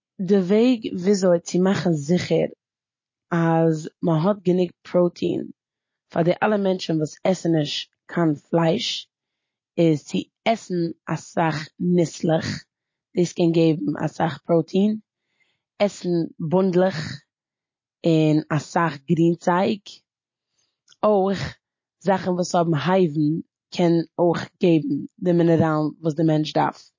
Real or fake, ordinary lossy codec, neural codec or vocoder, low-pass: real; MP3, 32 kbps; none; 7.2 kHz